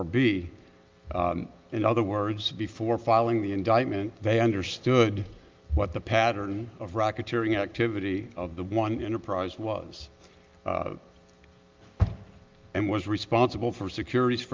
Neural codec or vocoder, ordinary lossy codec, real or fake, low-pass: none; Opus, 32 kbps; real; 7.2 kHz